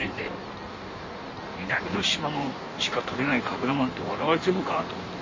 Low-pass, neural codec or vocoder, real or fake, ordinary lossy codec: 7.2 kHz; codec, 16 kHz in and 24 kHz out, 1.1 kbps, FireRedTTS-2 codec; fake; MP3, 32 kbps